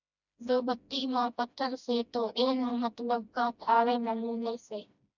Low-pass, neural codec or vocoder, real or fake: 7.2 kHz; codec, 16 kHz, 1 kbps, FreqCodec, smaller model; fake